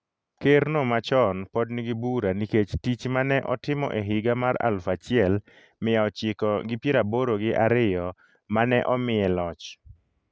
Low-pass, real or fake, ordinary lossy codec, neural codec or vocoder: none; real; none; none